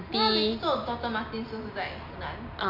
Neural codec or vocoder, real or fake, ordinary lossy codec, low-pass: none; real; MP3, 48 kbps; 5.4 kHz